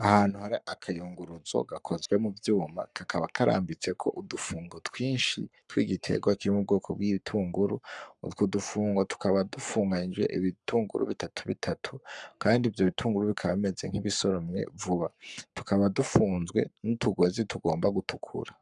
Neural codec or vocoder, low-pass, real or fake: codec, 44.1 kHz, 7.8 kbps, Pupu-Codec; 10.8 kHz; fake